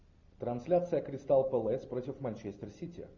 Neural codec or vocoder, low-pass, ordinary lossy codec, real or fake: none; 7.2 kHz; MP3, 64 kbps; real